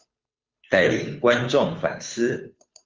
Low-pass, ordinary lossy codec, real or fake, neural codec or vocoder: 7.2 kHz; Opus, 32 kbps; fake; codec, 16 kHz, 2 kbps, FunCodec, trained on Chinese and English, 25 frames a second